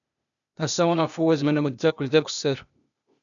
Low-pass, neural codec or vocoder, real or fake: 7.2 kHz; codec, 16 kHz, 0.8 kbps, ZipCodec; fake